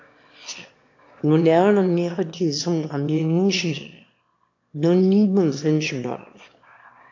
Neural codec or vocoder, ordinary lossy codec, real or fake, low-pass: autoencoder, 22.05 kHz, a latent of 192 numbers a frame, VITS, trained on one speaker; AAC, 48 kbps; fake; 7.2 kHz